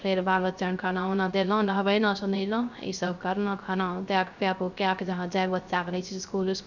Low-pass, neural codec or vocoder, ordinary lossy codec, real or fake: 7.2 kHz; codec, 16 kHz, 0.3 kbps, FocalCodec; none; fake